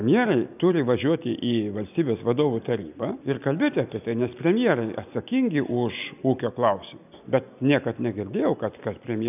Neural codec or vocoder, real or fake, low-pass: none; real; 3.6 kHz